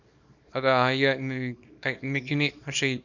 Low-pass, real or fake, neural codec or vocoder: 7.2 kHz; fake; codec, 24 kHz, 0.9 kbps, WavTokenizer, small release